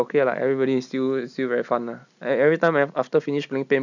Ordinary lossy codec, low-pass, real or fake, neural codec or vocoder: none; 7.2 kHz; real; none